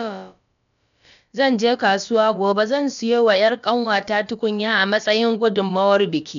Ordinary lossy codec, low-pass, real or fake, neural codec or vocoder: none; 7.2 kHz; fake; codec, 16 kHz, about 1 kbps, DyCAST, with the encoder's durations